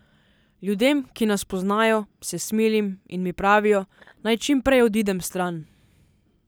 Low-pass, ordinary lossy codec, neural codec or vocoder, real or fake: none; none; none; real